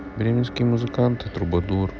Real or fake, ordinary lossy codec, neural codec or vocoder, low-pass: real; none; none; none